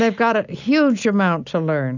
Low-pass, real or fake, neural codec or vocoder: 7.2 kHz; real; none